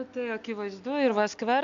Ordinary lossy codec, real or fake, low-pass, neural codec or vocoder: MP3, 64 kbps; real; 7.2 kHz; none